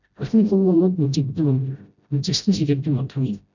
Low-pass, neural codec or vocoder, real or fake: 7.2 kHz; codec, 16 kHz, 0.5 kbps, FreqCodec, smaller model; fake